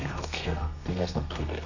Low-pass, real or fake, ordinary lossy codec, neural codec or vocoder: 7.2 kHz; fake; none; codec, 32 kHz, 1.9 kbps, SNAC